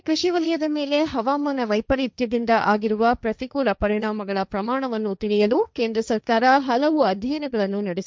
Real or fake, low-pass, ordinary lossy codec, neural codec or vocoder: fake; none; none; codec, 16 kHz, 1.1 kbps, Voila-Tokenizer